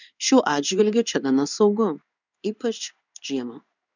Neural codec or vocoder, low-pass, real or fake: codec, 16 kHz in and 24 kHz out, 1 kbps, XY-Tokenizer; 7.2 kHz; fake